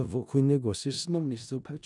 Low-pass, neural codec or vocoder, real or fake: 10.8 kHz; codec, 16 kHz in and 24 kHz out, 0.4 kbps, LongCat-Audio-Codec, four codebook decoder; fake